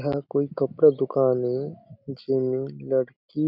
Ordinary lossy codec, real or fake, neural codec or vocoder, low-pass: none; real; none; 5.4 kHz